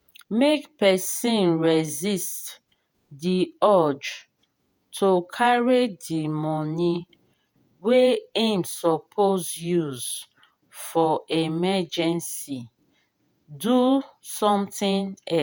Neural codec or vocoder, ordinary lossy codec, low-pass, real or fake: vocoder, 48 kHz, 128 mel bands, Vocos; none; none; fake